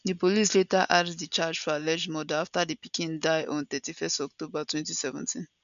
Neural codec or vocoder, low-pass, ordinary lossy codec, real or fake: none; 7.2 kHz; none; real